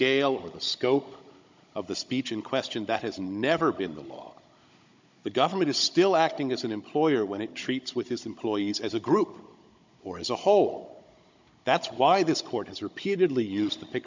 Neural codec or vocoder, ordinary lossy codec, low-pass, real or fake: codec, 16 kHz, 16 kbps, FunCodec, trained on Chinese and English, 50 frames a second; MP3, 64 kbps; 7.2 kHz; fake